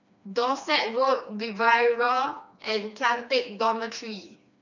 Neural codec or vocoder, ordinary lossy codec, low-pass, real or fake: codec, 16 kHz, 2 kbps, FreqCodec, smaller model; none; 7.2 kHz; fake